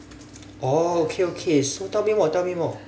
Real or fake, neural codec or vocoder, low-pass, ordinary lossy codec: real; none; none; none